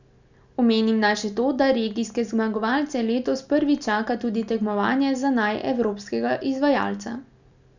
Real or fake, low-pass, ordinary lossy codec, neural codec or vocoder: real; 7.2 kHz; none; none